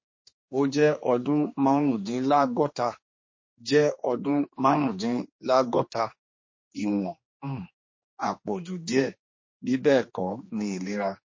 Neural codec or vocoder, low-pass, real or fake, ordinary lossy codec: codec, 16 kHz, 2 kbps, X-Codec, HuBERT features, trained on general audio; 7.2 kHz; fake; MP3, 32 kbps